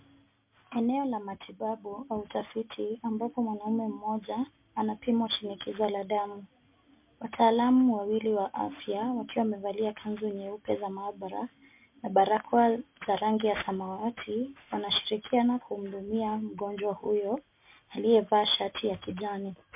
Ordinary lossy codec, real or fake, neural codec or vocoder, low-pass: MP3, 32 kbps; real; none; 3.6 kHz